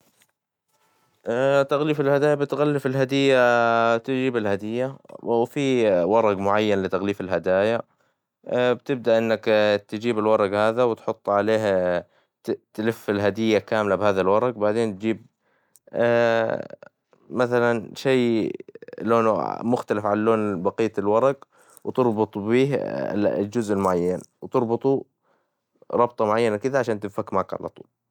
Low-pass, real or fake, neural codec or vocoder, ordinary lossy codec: 19.8 kHz; real; none; none